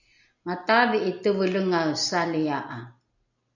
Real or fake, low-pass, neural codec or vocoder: real; 7.2 kHz; none